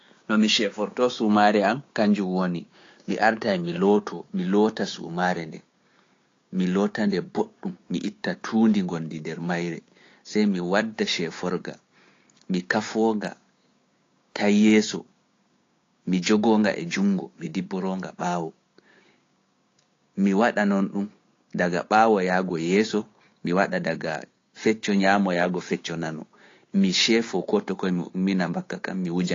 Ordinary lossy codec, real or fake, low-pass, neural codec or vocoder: AAC, 32 kbps; fake; 7.2 kHz; codec, 16 kHz, 6 kbps, DAC